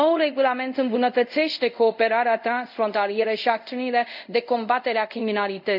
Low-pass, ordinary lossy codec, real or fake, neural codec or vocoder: 5.4 kHz; none; fake; codec, 24 kHz, 0.5 kbps, DualCodec